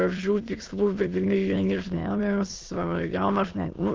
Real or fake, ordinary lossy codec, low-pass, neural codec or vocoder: fake; Opus, 24 kbps; 7.2 kHz; autoencoder, 22.05 kHz, a latent of 192 numbers a frame, VITS, trained on many speakers